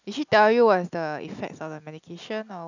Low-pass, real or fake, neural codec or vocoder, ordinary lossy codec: 7.2 kHz; real; none; none